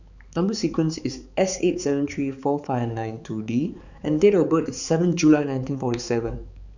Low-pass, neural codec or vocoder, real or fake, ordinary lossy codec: 7.2 kHz; codec, 16 kHz, 4 kbps, X-Codec, HuBERT features, trained on balanced general audio; fake; none